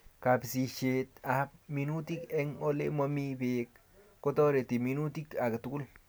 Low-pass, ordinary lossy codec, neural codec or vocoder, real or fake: none; none; none; real